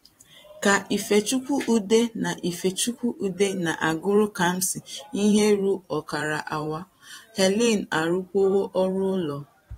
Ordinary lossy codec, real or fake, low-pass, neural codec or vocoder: AAC, 48 kbps; fake; 19.8 kHz; vocoder, 44.1 kHz, 128 mel bands every 512 samples, BigVGAN v2